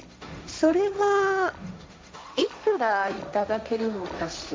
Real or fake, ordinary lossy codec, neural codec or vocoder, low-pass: fake; none; codec, 16 kHz, 1.1 kbps, Voila-Tokenizer; none